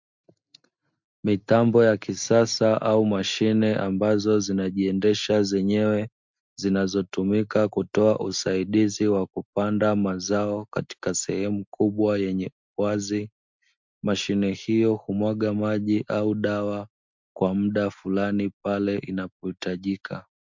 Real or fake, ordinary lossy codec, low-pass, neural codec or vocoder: real; MP3, 64 kbps; 7.2 kHz; none